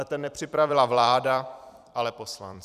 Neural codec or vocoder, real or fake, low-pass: vocoder, 44.1 kHz, 128 mel bands every 256 samples, BigVGAN v2; fake; 14.4 kHz